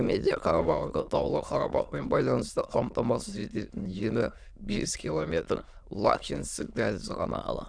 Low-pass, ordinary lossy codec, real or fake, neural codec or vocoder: 9.9 kHz; none; fake; autoencoder, 22.05 kHz, a latent of 192 numbers a frame, VITS, trained on many speakers